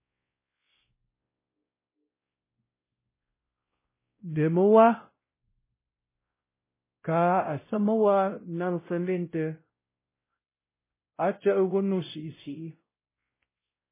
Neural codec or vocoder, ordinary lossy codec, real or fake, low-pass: codec, 16 kHz, 0.5 kbps, X-Codec, WavLM features, trained on Multilingual LibriSpeech; MP3, 16 kbps; fake; 3.6 kHz